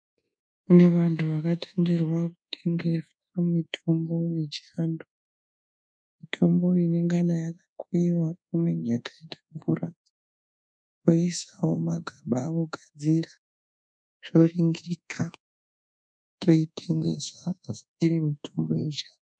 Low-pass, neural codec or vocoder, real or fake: 9.9 kHz; codec, 24 kHz, 1.2 kbps, DualCodec; fake